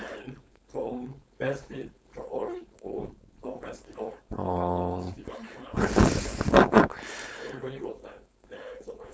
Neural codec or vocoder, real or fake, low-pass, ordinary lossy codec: codec, 16 kHz, 4.8 kbps, FACodec; fake; none; none